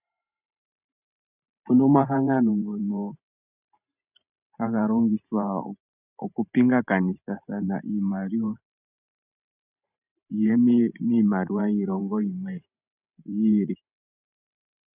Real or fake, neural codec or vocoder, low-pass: fake; vocoder, 44.1 kHz, 128 mel bands every 512 samples, BigVGAN v2; 3.6 kHz